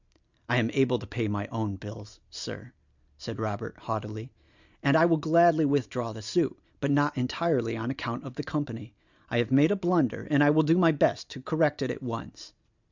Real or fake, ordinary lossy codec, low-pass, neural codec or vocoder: real; Opus, 64 kbps; 7.2 kHz; none